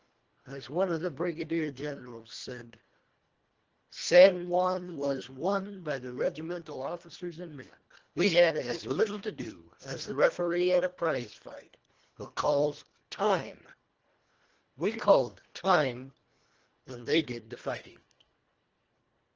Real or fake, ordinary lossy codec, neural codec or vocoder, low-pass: fake; Opus, 16 kbps; codec, 24 kHz, 1.5 kbps, HILCodec; 7.2 kHz